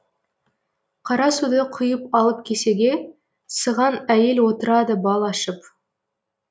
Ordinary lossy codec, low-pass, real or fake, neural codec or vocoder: none; none; real; none